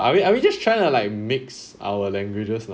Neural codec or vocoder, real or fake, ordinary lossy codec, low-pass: none; real; none; none